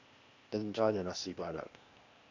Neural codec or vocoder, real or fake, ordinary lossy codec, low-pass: codec, 16 kHz, 0.8 kbps, ZipCodec; fake; AAC, 48 kbps; 7.2 kHz